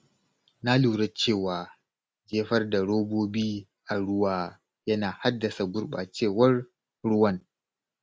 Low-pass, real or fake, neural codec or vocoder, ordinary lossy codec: none; real; none; none